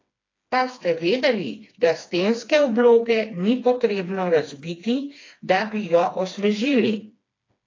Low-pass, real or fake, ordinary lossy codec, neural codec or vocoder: 7.2 kHz; fake; AAC, 32 kbps; codec, 16 kHz, 2 kbps, FreqCodec, smaller model